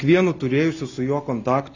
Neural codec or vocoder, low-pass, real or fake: none; 7.2 kHz; real